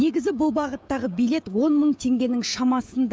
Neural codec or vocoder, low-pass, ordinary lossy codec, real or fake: codec, 16 kHz, 16 kbps, FreqCodec, smaller model; none; none; fake